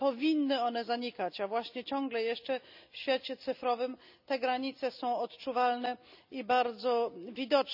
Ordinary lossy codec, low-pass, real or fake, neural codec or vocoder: none; 5.4 kHz; real; none